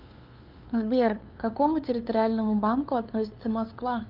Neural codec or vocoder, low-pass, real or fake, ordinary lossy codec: codec, 16 kHz, 2 kbps, FunCodec, trained on LibriTTS, 25 frames a second; 5.4 kHz; fake; Opus, 32 kbps